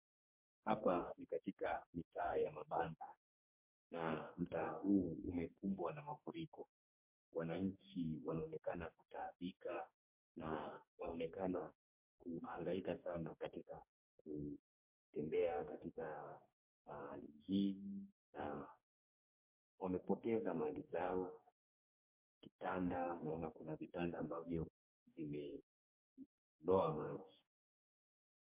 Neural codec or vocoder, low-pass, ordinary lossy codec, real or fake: codec, 44.1 kHz, 2.6 kbps, DAC; 3.6 kHz; MP3, 32 kbps; fake